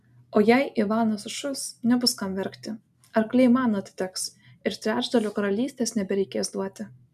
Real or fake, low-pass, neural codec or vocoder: real; 14.4 kHz; none